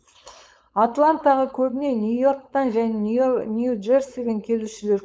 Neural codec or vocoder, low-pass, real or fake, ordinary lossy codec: codec, 16 kHz, 4.8 kbps, FACodec; none; fake; none